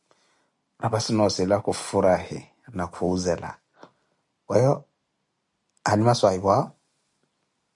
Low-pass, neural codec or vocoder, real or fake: 10.8 kHz; none; real